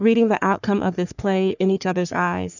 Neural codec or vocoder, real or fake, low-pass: codec, 44.1 kHz, 3.4 kbps, Pupu-Codec; fake; 7.2 kHz